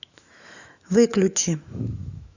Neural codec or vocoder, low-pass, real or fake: autoencoder, 48 kHz, 128 numbers a frame, DAC-VAE, trained on Japanese speech; 7.2 kHz; fake